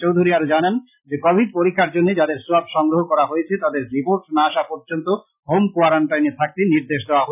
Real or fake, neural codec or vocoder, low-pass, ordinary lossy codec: real; none; 3.6 kHz; none